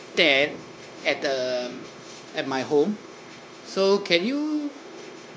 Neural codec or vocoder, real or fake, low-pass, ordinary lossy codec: codec, 16 kHz, 0.9 kbps, LongCat-Audio-Codec; fake; none; none